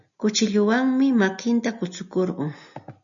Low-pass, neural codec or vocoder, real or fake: 7.2 kHz; none; real